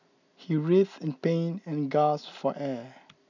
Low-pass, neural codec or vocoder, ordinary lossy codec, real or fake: 7.2 kHz; none; none; real